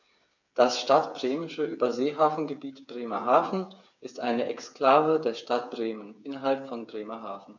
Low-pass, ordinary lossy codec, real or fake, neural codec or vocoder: 7.2 kHz; none; fake; codec, 16 kHz, 8 kbps, FreqCodec, smaller model